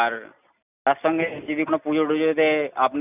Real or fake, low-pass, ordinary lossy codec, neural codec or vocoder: real; 3.6 kHz; none; none